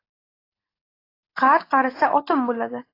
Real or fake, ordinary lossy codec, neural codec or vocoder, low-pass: real; AAC, 24 kbps; none; 5.4 kHz